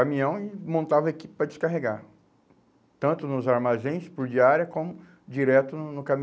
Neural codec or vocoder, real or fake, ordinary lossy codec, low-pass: none; real; none; none